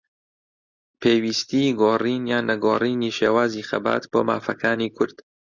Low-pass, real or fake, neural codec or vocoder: 7.2 kHz; real; none